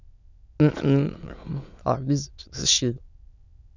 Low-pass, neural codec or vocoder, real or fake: 7.2 kHz; autoencoder, 22.05 kHz, a latent of 192 numbers a frame, VITS, trained on many speakers; fake